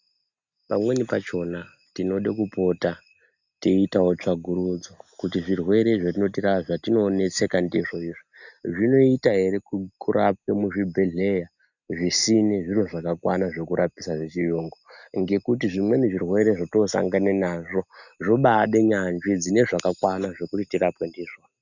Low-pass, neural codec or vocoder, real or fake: 7.2 kHz; none; real